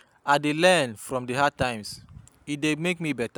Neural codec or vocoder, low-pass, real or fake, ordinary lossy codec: none; none; real; none